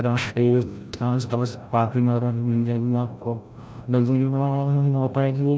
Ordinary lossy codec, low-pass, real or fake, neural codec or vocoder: none; none; fake; codec, 16 kHz, 0.5 kbps, FreqCodec, larger model